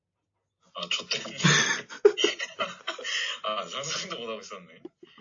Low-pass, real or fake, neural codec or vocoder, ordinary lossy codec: 7.2 kHz; real; none; AAC, 48 kbps